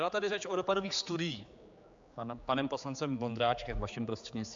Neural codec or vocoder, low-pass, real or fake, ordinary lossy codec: codec, 16 kHz, 4 kbps, X-Codec, HuBERT features, trained on general audio; 7.2 kHz; fake; MP3, 64 kbps